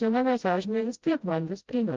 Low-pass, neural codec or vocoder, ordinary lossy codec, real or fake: 7.2 kHz; codec, 16 kHz, 0.5 kbps, FreqCodec, smaller model; Opus, 24 kbps; fake